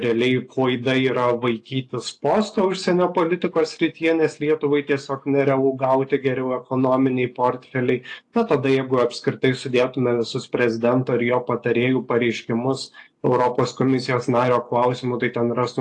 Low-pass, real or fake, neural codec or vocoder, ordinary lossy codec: 10.8 kHz; real; none; AAC, 48 kbps